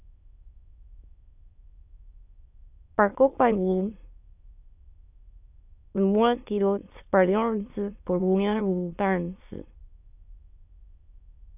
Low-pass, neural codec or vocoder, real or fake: 3.6 kHz; autoencoder, 22.05 kHz, a latent of 192 numbers a frame, VITS, trained on many speakers; fake